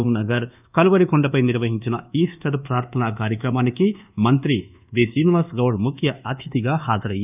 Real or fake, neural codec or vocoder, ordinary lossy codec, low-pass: fake; codec, 24 kHz, 1.2 kbps, DualCodec; none; 3.6 kHz